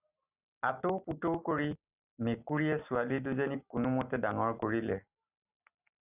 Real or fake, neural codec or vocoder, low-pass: real; none; 3.6 kHz